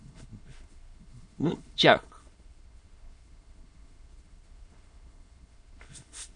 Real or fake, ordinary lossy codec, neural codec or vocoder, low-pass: fake; MP3, 48 kbps; autoencoder, 22.05 kHz, a latent of 192 numbers a frame, VITS, trained on many speakers; 9.9 kHz